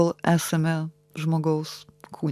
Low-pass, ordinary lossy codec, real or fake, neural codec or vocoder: 14.4 kHz; AAC, 96 kbps; real; none